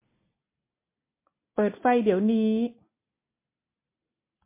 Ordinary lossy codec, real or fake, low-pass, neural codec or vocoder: MP3, 24 kbps; real; 3.6 kHz; none